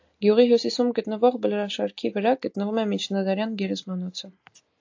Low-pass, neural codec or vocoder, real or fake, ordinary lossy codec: 7.2 kHz; none; real; AAC, 48 kbps